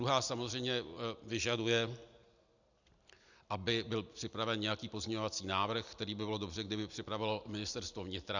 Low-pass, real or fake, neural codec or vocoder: 7.2 kHz; real; none